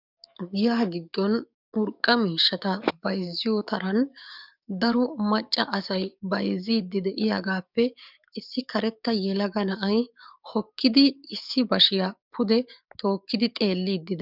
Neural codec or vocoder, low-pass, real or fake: codec, 44.1 kHz, 7.8 kbps, DAC; 5.4 kHz; fake